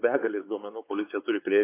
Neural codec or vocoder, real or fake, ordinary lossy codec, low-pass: codec, 16 kHz, 4 kbps, X-Codec, WavLM features, trained on Multilingual LibriSpeech; fake; AAC, 24 kbps; 3.6 kHz